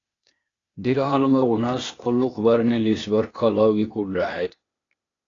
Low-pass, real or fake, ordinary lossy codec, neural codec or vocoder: 7.2 kHz; fake; AAC, 32 kbps; codec, 16 kHz, 0.8 kbps, ZipCodec